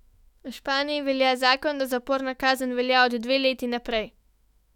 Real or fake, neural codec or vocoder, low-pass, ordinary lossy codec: fake; autoencoder, 48 kHz, 128 numbers a frame, DAC-VAE, trained on Japanese speech; 19.8 kHz; none